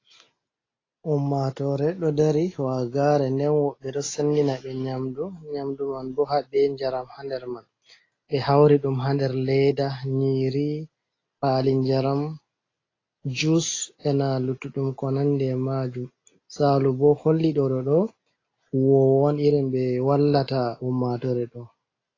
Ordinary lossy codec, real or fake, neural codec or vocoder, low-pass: AAC, 32 kbps; real; none; 7.2 kHz